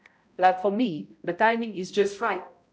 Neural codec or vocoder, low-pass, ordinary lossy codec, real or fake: codec, 16 kHz, 0.5 kbps, X-Codec, HuBERT features, trained on balanced general audio; none; none; fake